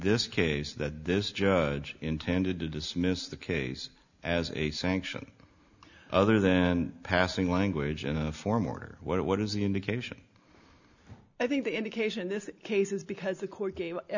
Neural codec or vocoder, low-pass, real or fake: none; 7.2 kHz; real